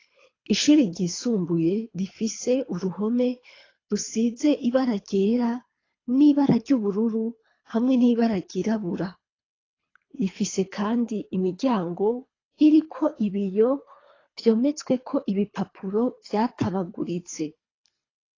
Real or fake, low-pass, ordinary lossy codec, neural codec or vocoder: fake; 7.2 kHz; AAC, 32 kbps; codec, 24 kHz, 3 kbps, HILCodec